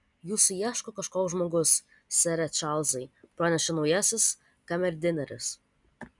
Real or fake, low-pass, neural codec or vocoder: real; 10.8 kHz; none